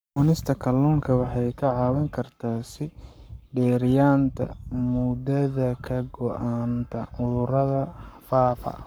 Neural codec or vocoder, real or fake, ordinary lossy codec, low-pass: codec, 44.1 kHz, 7.8 kbps, Pupu-Codec; fake; none; none